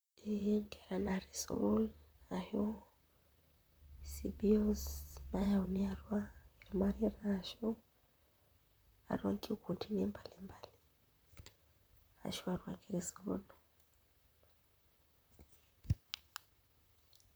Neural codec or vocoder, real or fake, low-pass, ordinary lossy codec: vocoder, 44.1 kHz, 128 mel bands, Pupu-Vocoder; fake; none; none